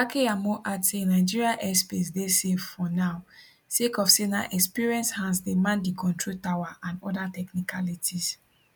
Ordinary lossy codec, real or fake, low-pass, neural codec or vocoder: none; real; 19.8 kHz; none